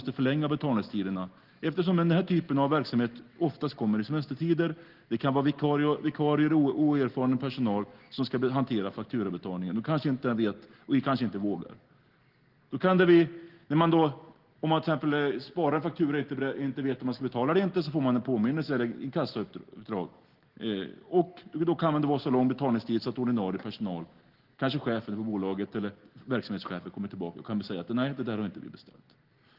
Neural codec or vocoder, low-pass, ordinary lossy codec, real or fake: none; 5.4 kHz; Opus, 16 kbps; real